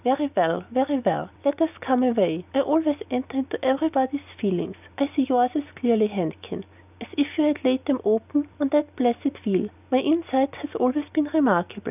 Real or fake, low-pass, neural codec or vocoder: real; 3.6 kHz; none